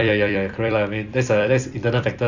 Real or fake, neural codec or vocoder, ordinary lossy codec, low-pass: fake; vocoder, 44.1 kHz, 128 mel bands every 256 samples, BigVGAN v2; none; 7.2 kHz